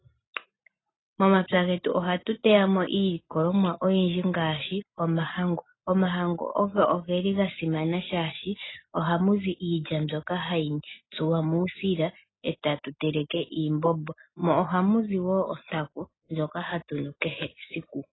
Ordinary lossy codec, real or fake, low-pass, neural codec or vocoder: AAC, 16 kbps; real; 7.2 kHz; none